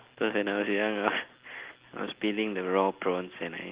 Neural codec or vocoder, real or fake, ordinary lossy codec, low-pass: none; real; Opus, 16 kbps; 3.6 kHz